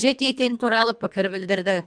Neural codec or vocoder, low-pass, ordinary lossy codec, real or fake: codec, 24 kHz, 1.5 kbps, HILCodec; 9.9 kHz; none; fake